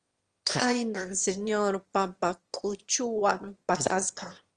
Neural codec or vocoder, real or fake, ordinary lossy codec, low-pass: autoencoder, 22.05 kHz, a latent of 192 numbers a frame, VITS, trained on one speaker; fake; Opus, 24 kbps; 9.9 kHz